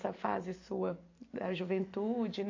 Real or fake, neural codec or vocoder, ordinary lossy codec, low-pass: real; none; AAC, 48 kbps; 7.2 kHz